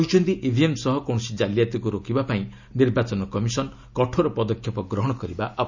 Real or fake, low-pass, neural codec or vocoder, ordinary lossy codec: real; 7.2 kHz; none; none